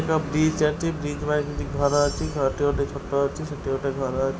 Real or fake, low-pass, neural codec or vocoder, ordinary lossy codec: real; none; none; none